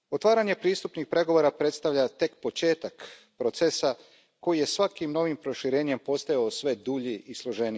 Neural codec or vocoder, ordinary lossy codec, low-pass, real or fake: none; none; none; real